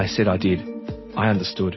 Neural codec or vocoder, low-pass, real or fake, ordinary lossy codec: none; 7.2 kHz; real; MP3, 24 kbps